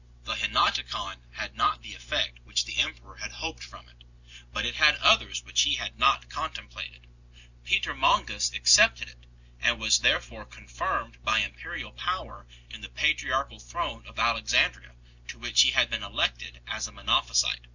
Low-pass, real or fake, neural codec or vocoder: 7.2 kHz; real; none